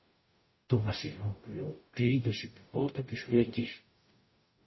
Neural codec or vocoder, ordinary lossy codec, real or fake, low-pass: codec, 44.1 kHz, 0.9 kbps, DAC; MP3, 24 kbps; fake; 7.2 kHz